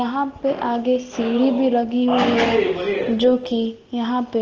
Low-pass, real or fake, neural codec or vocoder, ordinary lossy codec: 7.2 kHz; real; none; Opus, 16 kbps